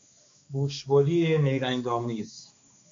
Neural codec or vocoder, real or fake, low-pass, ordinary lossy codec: codec, 16 kHz, 2 kbps, X-Codec, HuBERT features, trained on balanced general audio; fake; 7.2 kHz; MP3, 48 kbps